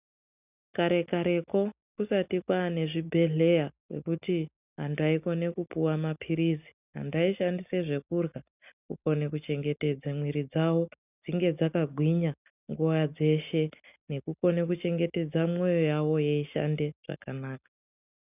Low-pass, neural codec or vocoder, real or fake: 3.6 kHz; none; real